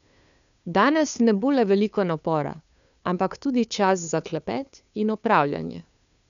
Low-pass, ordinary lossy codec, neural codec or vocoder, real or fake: 7.2 kHz; none; codec, 16 kHz, 2 kbps, FunCodec, trained on Chinese and English, 25 frames a second; fake